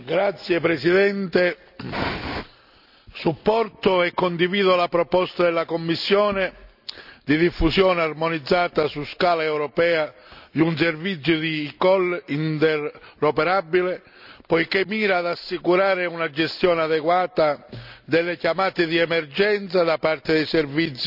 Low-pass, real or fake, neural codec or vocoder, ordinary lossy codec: 5.4 kHz; real; none; none